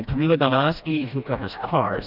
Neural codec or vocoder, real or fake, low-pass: codec, 16 kHz, 1 kbps, FreqCodec, smaller model; fake; 5.4 kHz